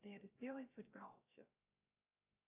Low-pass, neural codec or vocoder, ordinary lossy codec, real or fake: 3.6 kHz; codec, 16 kHz, 0.3 kbps, FocalCodec; AAC, 32 kbps; fake